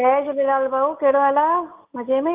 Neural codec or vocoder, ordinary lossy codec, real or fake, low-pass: none; Opus, 32 kbps; real; 3.6 kHz